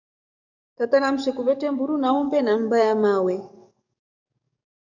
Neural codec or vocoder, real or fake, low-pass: codec, 44.1 kHz, 7.8 kbps, DAC; fake; 7.2 kHz